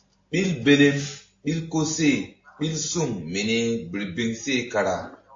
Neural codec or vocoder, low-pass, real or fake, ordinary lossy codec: none; 7.2 kHz; real; AAC, 48 kbps